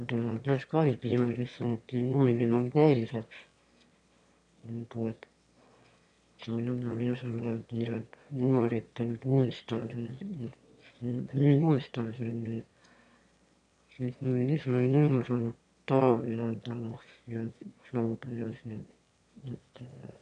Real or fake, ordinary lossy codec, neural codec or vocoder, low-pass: fake; MP3, 96 kbps; autoencoder, 22.05 kHz, a latent of 192 numbers a frame, VITS, trained on one speaker; 9.9 kHz